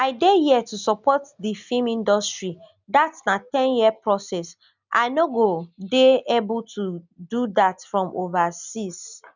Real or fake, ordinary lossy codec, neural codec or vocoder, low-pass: real; none; none; 7.2 kHz